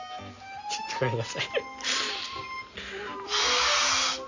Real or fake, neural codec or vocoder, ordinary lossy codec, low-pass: real; none; AAC, 48 kbps; 7.2 kHz